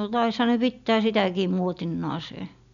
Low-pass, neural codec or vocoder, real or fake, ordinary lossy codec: 7.2 kHz; none; real; none